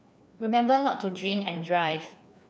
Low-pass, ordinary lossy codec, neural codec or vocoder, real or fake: none; none; codec, 16 kHz, 2 kbps, FreqCodec, larger model; fake